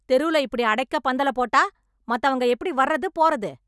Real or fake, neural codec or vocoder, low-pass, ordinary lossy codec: real; none; none; none